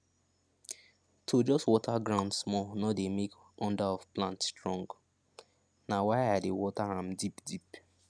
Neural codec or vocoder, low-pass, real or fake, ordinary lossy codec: none; none; real; none